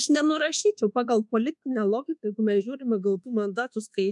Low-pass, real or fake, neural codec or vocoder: 10.8 kHz; fake; codec, 24 kHz, 1.2 kbps, DualCodec